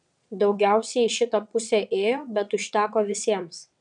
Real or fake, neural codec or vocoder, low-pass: fake; vocoder, 22.05 kHz, 80 mel bands, WaveNeXt; 9.9 kHz